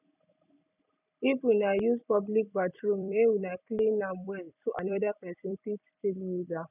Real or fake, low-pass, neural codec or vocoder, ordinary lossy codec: fake; 3.6 kHz; vocoder, 44.1 kHz, 128 mel bands every 256 samples, BigVGAN v2; none